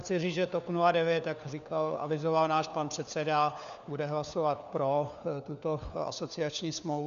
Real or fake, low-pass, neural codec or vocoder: fake; 7.2 kHz; codec, 16 kHz, 4 kbps, FunCodec, trained on LibriTTS, 50 frames a second